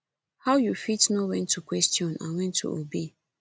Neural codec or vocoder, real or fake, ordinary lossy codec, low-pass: none; real; none; none